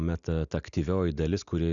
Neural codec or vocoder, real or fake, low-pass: none; real; 7.2 kHz